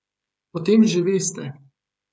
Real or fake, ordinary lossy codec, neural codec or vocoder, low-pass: fake; none; codec, 16 kHz, 16 kbps, FreqCodec, smaller model; none